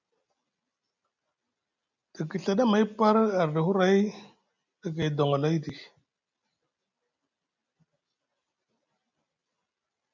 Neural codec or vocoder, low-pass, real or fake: none; 7.2 kHz; real